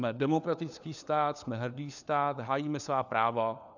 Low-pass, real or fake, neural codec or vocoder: 7.2 kHz; fake; codec, 16 kHz, 4 kbps, FunCodec, trained on LibriTTS, 50 frames a second